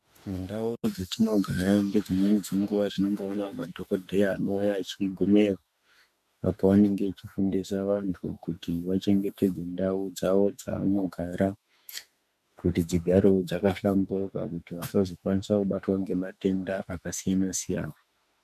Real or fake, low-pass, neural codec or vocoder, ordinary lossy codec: fake; 14.4 kHz; autoencoder, 48 kHz, 32 numbers a frame, DAC-VAE, trained on Japanese speech; MP3, 96 kbps